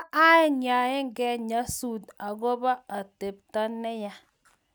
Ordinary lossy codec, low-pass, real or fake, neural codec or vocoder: none; none; real; none